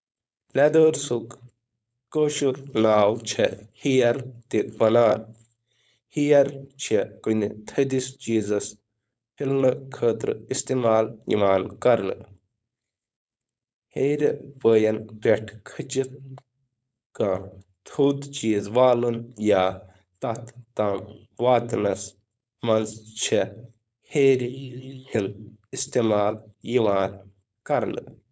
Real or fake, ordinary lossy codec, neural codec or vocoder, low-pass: fake; none; codec, 16 kHz, 4.8 kbps, FACodec; none